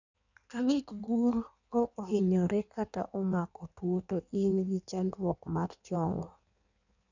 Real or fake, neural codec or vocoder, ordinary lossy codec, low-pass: fake; codec, 16 kHz in and 24 kHz out, 1.1 kbps, FireRedTTS-2 codec; none; 7.2 kHz